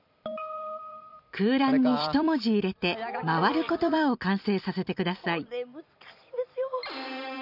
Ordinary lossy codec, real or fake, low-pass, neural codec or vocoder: none; fake; 5.4 kHz; vocoder, 44.1 kHz, 128 mel bands every 256 samples, BigVGAN v2